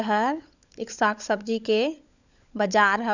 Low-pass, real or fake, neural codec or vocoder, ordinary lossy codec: 7.2 kHz; fake; codec, 16 kHz, 16 kbps, FunCodec, trained on LibriTTS, 50 frames a second; none